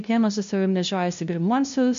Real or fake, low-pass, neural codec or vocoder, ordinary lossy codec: fake; 7.2 kHz; codec, 16 kHz, 0.5 kbps, FunCodec, trained on LibriTTS, 25 frames a second; MP3, 48 kbps